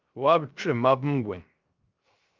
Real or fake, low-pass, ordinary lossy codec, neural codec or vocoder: fake; 7.2 kHz; Opus, 32 kbps; codec, 16 kHz, 0.3 kbps, FocalCodec